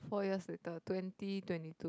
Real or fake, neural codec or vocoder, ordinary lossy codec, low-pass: real; none; none; none